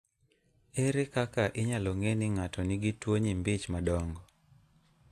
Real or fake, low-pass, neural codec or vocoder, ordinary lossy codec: real; 14.4 kHz; none; AAC, 64 kbps